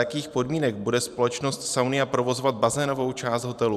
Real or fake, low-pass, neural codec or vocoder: fake; 14.4 kHz; vocoder, 44.1 kHz, 128 mel bands every 512 samples, BigVGAN v2